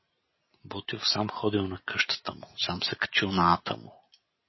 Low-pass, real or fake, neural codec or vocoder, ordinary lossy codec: 7.2 kHz; real; none; MP3, 24 kbps